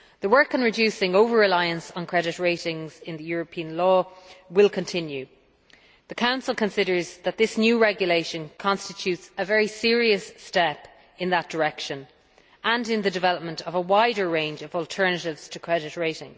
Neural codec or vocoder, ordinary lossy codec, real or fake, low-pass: none; none; real; none